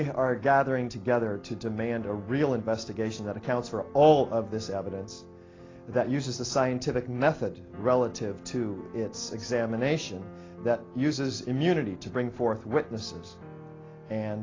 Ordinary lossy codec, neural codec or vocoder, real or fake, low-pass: AAC, 32 kbps; none; real; 7.2 kHz